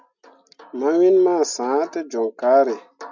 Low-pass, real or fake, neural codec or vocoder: 7.2 kHz; real; none